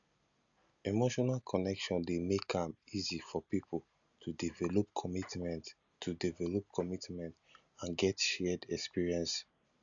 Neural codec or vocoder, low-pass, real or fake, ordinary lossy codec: none; 7.2 kHz; real; none